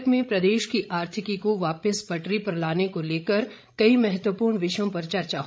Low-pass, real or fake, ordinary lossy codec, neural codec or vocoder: none; fake; none; codec, 16 kHz, 16 kbps, FreqCodec, larger model